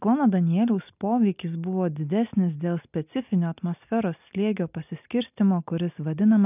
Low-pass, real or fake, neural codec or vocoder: 3.6 kHz; real; none